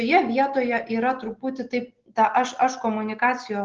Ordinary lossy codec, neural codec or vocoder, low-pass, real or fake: Opus, 16 kbps; none; 7.2 kHz; real